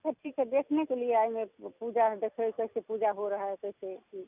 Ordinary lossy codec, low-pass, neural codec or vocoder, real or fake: none; 3.6 kHz; none; real